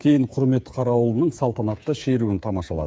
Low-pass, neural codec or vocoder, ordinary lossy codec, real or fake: none; codec, 16 kHz, 8 kbps, FreqCodec, smaller model; none; fake